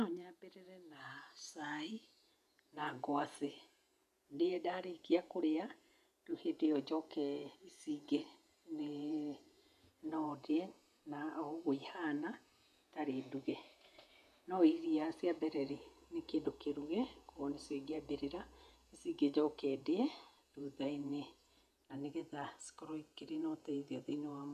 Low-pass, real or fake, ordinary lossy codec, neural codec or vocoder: 14.4 kHz; fake; none; vocoder, 48 kHz, 128 mel bands, Vocos